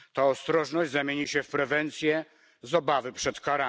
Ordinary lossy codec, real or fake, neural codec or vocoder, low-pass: none; real; none; none